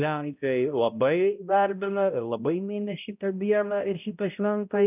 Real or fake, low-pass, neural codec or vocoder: fake; 3.6 kHz; codec, 16 kHz, 0.5 kbps, X-Codec, HuBERT features, trained on balanced general audio